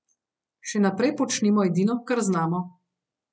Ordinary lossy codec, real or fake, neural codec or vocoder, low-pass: none; real; none; none